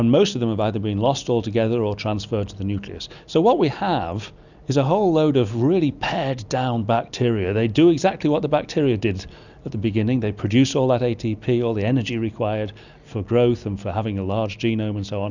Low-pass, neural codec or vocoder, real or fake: 7.2 kHz; none; real